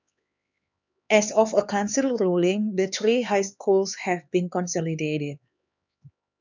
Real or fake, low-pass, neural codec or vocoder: fake; 7.2 kHz; codec, 16 kHz, 4 kbps, X-Codec, HuBERT features, trained on LibriSpeech